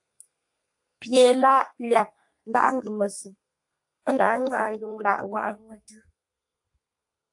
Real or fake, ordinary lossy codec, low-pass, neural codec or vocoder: fake; AAC, 64 kbps; 10.8 kHz; codec, 32 kHz, 1.9 kbps, SNAC